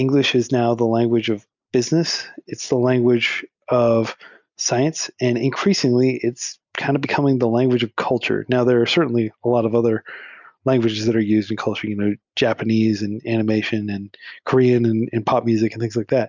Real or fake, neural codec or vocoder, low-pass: real; none; 7.2 kHz